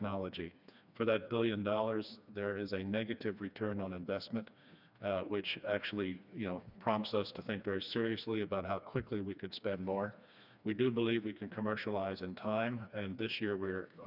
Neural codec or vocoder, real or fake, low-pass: codec, 16 kHz, 2 kbps, FreqCodec, smaller model; fake; 5.4 kHz